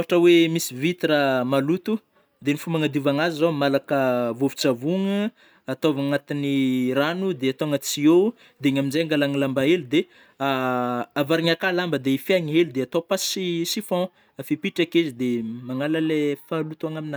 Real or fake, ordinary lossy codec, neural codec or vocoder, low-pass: real; none; none; none